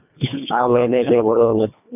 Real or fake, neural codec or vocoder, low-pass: fake; codec, 24 kHz, 1.5 kbps, HILCodec; 3.6 kHz